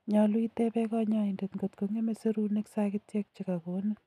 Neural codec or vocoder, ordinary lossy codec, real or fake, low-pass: none; none; real; 14.4 kHz